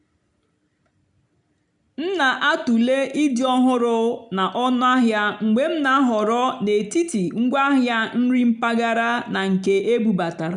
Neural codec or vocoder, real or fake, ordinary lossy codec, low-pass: none; real; none; 9.9 kHz